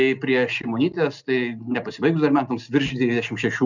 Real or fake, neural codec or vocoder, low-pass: real; none; 7.2 kHz